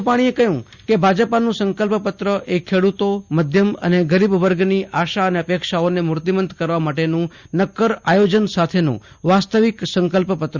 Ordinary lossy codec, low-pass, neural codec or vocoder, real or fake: Opus, 64 kbps; 7.2 kHz; none; real